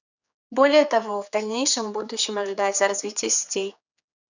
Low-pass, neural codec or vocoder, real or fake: 7.2 kHz; codec, 16 kHz, 4 kbps, X-Codec, HuBERT features, trained on general audio; fake